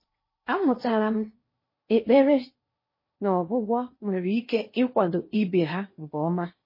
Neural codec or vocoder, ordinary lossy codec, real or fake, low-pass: codec, 16 kHz in and 24 kHz out, 0.8 kbps, FocalCodec, streaming, 65536 codes; MP3, 24 kbps; fake; 5.4 kHz